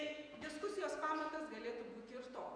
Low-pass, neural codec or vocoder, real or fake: 9.9 kHz; vocoder, 48 kHz, 128 mel bands, Vocos; fake